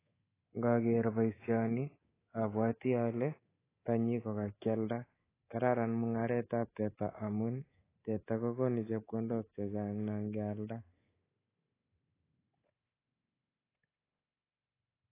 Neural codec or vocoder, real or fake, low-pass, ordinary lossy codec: codec, 24 kHz, 3.1 kbps, DualCodec; fake; 3.6 kHz; AAC, 16 kbps